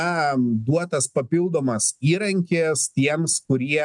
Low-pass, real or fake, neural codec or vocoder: 10.8 kHz; real; none